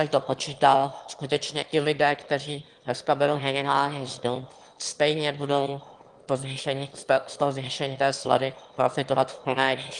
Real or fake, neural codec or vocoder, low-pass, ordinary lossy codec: fake; autoencoder, 22.05 kHz, a latent of 192 numbers a frame, VITS, trained on one speaker; 9.9 kHz; Opus, 24 kbps